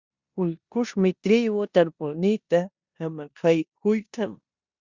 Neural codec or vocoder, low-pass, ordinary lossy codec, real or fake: codec, 16 kHz in and 24 kHz out, 0.9 kbps, LongCat-Audio-Codec, four codebook decoder; 7.2 kHz; Opus, 64 kbps; fake